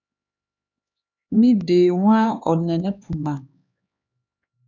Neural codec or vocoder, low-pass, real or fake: codec, 16 kHz, 4 kbps, X-Codec, HuBERT features, trained on LibriSpeech; 7.2 kHz; fake